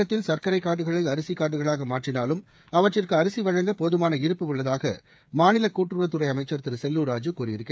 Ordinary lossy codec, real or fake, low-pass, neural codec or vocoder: none; fake; none; codec, 16 kHz, 8 kbps, FreqCodec, smaller model